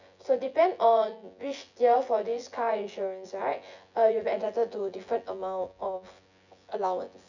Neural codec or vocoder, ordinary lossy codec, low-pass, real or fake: vocoder, 24 kHz, 100 mel bands, Vocos; none; 7.2 kHz; fake